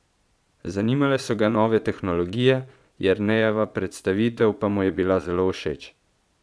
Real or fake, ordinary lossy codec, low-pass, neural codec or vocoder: fake; none; none; vocoder, 22.05 kHz, 80 mel bands, Vocos